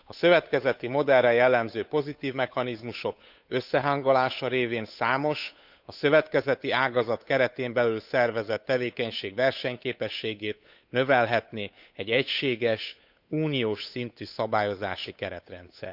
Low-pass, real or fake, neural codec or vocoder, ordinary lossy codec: 5.4 kHz; fake; codec, 16 kHz, 8 kbps, FunCodec, trained on Chinese and English, 25 frames a second; none